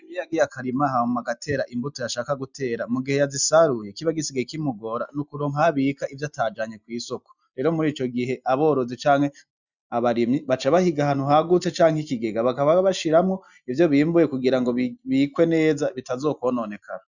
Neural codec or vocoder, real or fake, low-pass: none; real; 7.2 kHz